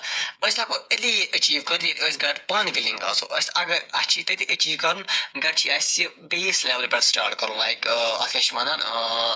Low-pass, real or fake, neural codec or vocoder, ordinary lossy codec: none; fake; codec, 16 kHz, 8 kbps, FreqCodec, smaller model; none